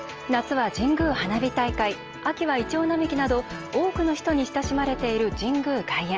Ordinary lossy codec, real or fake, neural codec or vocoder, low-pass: Opus, 24 kbps; real; none; 7.2 kHz